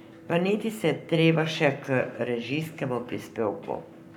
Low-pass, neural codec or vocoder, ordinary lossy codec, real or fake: 19.8 kHz; codec, 44.1 kHz, 7.8 kbps, Pupu-Codec; none; fake